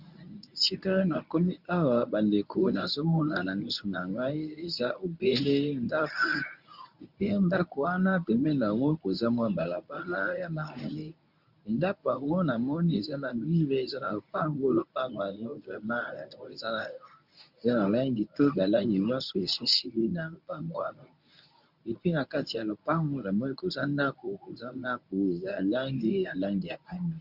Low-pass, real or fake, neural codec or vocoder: 5.4 kHz; fake; codec, 24 kHz, 0.9 kbps, WavTokenizer, medium speech release version 1